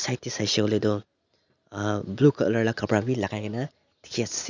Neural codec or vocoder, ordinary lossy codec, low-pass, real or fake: none; none; 7.2 kHz; real